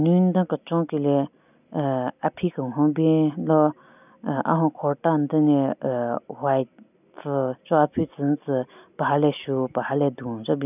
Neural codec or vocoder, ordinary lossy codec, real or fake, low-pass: none; none; real; 3.6 kHz